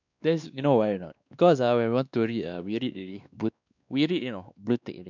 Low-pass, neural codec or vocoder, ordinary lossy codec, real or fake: 7.2 kHz; codec, 16 kHz, 2 kbps, X-Codec, WavLM features, trained on Multilingual LibriSpeech; none; fake